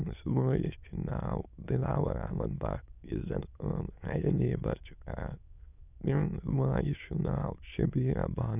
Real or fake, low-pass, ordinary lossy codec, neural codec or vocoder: fake; 3.6 kHz; none; autoencoder, 22.05 kHz, a latent of 192 numbers a frame, VITS, trained on many speakers